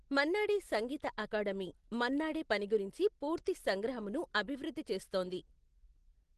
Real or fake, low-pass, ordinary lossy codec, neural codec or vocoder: real; 10.8 kHz; Opus, 16 kbps; none